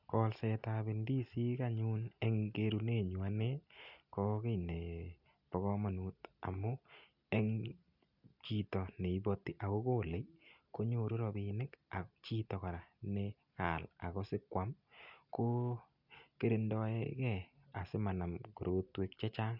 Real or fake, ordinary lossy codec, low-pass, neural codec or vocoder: real; none; 5.4 kHz; none